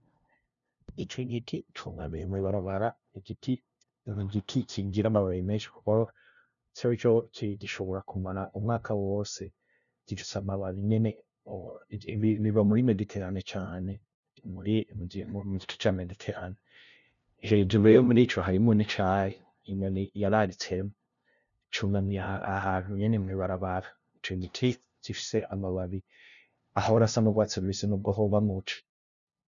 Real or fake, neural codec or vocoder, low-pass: fake; codec, 16 kHz, 0.5 kbps, FunCodec, trained on LibriTTS, 25 frames a second; 7.2 kHz